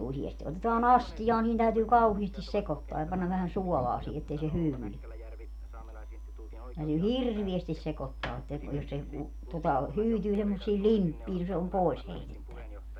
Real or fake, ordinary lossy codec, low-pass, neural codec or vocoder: real; none; 19.8 kHz; none